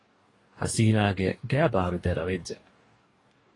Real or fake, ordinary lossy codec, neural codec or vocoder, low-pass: fake; AAC, 32 kbps; codec, 44.1 kHz, 2.6 kbps, DAC; 10.8 kHz